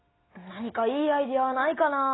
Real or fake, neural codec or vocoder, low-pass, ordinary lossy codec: real; none; 7.2 kHz; AAC, 16 kbps